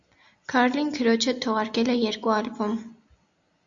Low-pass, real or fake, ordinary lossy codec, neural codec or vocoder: 7.2 kHz; real; Opus, 64 kbps; none